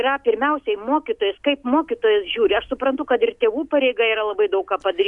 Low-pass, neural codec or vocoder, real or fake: 10.8 kHz; none; real